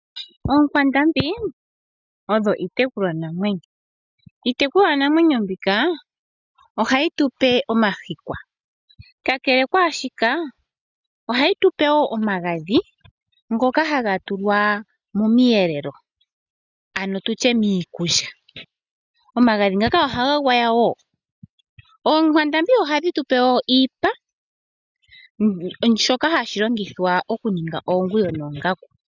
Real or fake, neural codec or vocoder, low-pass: real; none; 7.2 kHz